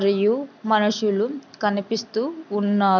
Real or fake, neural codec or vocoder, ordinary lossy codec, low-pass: real; none; none; 7.2 kHz